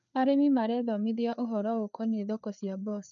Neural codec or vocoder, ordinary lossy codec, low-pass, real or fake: codec, 16 kHz, 4 kbps, FreqCodec, larger model; none; 7.2 kHz; fake